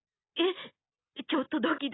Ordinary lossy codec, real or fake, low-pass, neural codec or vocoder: AAC, 16 kbps; real; 7.2 kHz; none